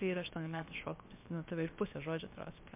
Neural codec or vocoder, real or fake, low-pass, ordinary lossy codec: codec, 16 kHz, about 1 kbps, DyCAST, with the encoder's durations; fake; 3.6 kHz; MP3, 32 kbps